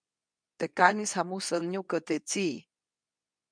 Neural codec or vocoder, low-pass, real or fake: codec, 24 kHz, 0.9 kbps, WavTokenizer, medium speech release version 2; 9.9 kHz; fake